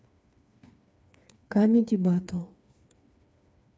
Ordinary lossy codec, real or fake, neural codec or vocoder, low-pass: none; fake; codec, 16 kHz, 4 kbps, FreqCodec, smaller model; none